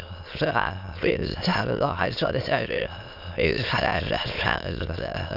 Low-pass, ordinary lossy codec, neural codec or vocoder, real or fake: 5.4 kHz; none; autoencoder, 22.05 kHz, a latent of 192 numbers a frame, VITS, trained on many speakers; fake